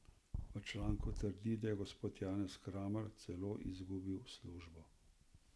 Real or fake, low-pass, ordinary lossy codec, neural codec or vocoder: real; none; none; none